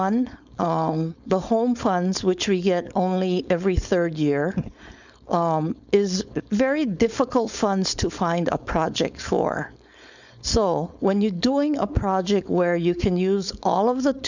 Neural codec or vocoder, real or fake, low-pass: codec, 16 kHz, 4.8 kbps, FACodec; fake; 7.2 kHz